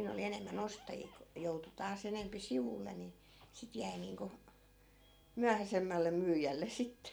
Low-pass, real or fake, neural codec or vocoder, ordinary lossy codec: none; fake; vocoder, 44.1 kHz, 128 mel bands every 256 samples, BigVGAN v2; none